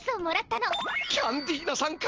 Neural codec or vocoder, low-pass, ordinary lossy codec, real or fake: none; 7.2 kHz; Opus, 16 kbps; real